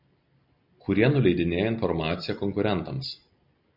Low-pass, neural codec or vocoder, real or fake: 5.4 kHz; none; real